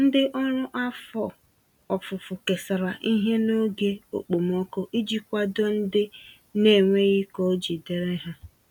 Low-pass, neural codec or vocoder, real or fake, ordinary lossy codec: 19.8 kHz; none; real; none